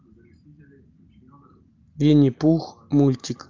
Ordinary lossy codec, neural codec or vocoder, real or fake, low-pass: Opus, 24 kbps; none; real; 7.2 kHz